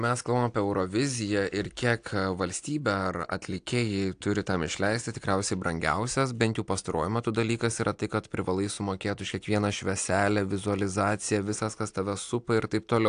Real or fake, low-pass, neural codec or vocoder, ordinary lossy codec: real; 9.9 kHz; none; AAC, 64 kbps